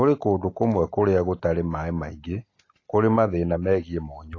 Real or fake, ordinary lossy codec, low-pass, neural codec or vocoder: real; AAC, 32 kbps; 7.2 kHz; none